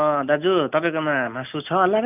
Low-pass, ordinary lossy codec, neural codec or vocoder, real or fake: 3.6 kHz; none; none; real